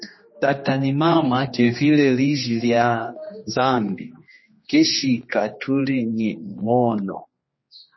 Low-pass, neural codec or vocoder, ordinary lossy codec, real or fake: 7.2 kHz; codec, 16 kHz, 2 kbps, X-Codec, HuBERT features, trained on general audio; MP3, 24 kbps; fake